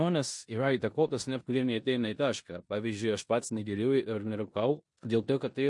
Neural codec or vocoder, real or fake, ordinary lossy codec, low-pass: codec, 16 kHz in and 24 kHz out, 0.9 kbps, LongCat-Audio-Codec, four codebook decoder; fake; MP3, 48 kbps; 10.8 kHz